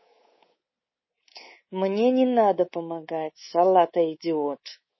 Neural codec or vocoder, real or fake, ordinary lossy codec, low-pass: codec, 24 kHz, 3.1 kbps, DualCodec; fake; MP3, 24 kbps; 7.2 kHz